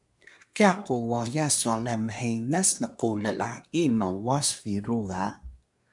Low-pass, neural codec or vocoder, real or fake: 10.8 kHz; codec, 24 kHz, 1 kbps, SNAC; fake